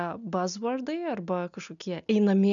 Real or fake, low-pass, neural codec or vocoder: real; 7.2 kHz; none